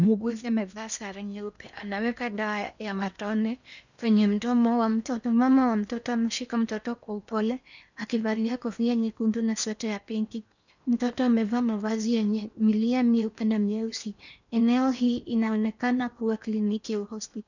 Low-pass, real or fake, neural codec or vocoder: 7.2 kHz; fake; codec, 16 kHz in and 24 kHz out, 0.8 kbps, FocalCodec, streaming, 65536 codes